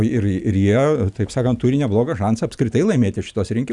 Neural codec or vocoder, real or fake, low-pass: none; real; 10.8 kHz